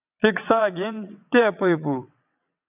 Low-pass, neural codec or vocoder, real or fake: 3.6 kHz; vocoder, 22.05 kHz, 80 mel bands, WaveNeXt; fake